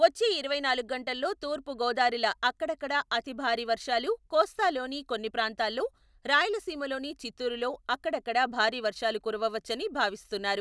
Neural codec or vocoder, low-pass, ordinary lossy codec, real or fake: none; none; none; real